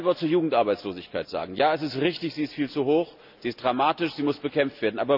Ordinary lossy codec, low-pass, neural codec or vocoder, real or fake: none; 5.4 kHz; none; real